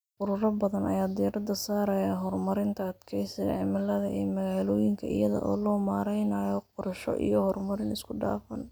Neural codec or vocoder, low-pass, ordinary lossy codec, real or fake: none; none; none; real